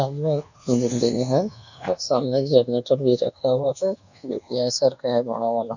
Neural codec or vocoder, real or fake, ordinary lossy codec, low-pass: codec, 24 kHz, 1.2 kbps, DualCodec; fake; none; 7.2 kHz